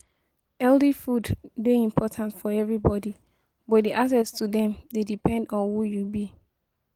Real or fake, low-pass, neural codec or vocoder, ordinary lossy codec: real; 19.8 kHz; none; Opus, 24 kbps